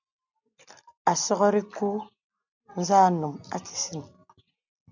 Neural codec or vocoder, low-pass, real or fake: none; 7.2 kHz; real